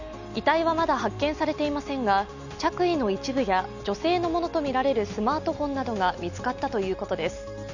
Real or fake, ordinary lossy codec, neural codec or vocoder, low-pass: real; none; none; 7.2 kHz